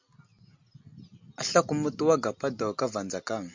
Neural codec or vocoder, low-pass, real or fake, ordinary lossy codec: none; 7.2 kHz; real; MP3, 64 kbps